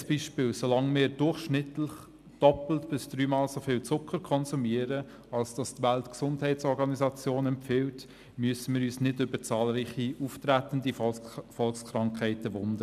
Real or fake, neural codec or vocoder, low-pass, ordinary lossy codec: real; none; 14.4 kHz; none